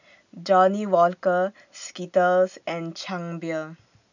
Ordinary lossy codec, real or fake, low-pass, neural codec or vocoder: none; real; 7.2 kHz; none